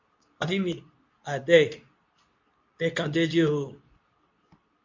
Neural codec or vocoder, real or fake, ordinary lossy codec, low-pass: codec, 24 kHz, 0.9 kbps, WavTokenizer, medium speech release version 2; fake; MP3, 48 kbps; 7.2 kHz